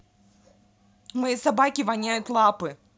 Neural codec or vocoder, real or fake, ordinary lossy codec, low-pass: none; real; none; none